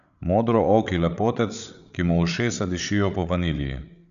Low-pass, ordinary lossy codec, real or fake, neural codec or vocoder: 7.2 kHz; none; fake; codec, 16 kHz, 8 kbps, FreqCodec, larger model